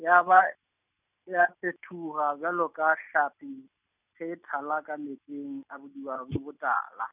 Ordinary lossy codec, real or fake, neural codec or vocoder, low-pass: none; real; none; 3.6 kHz